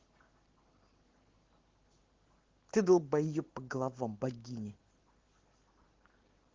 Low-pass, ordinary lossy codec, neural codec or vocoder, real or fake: 7.2 kHz; Opus, 16 kbps; none; real